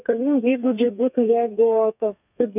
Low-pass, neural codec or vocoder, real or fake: 3.6 kHz; codec, 32 kHz, 1.9 kbps, SNAC; fake